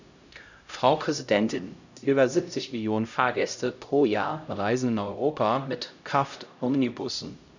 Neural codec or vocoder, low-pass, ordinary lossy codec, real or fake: codec, 16 kHz, 0.5 kbps, X-Codec, HuBERT features, trained on LibriSpeech; 7.2 kHz; none; fake